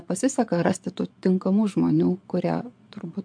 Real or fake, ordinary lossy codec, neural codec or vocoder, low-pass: fake; MP3, 64 kbps; vocoder, 22.05 kHz, 80 mel bands, Vocos; 9.9 kHz